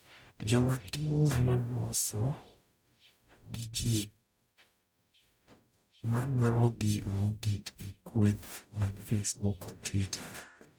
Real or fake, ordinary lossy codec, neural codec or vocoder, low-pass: fake; none; codec, 44.1 kHz, 0.9 kbps, DAC; none